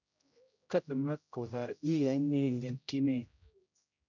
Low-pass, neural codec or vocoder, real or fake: 7.2 kHz; codec, 16 kHz, 0.5 kbps, X-Codec, HuBERT features, trained on general audio; fake